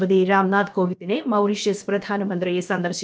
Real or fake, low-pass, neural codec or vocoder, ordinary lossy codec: fake; none; codec, 16 kHz, about 1 kbps, DyCAST, with the encoder's durations; none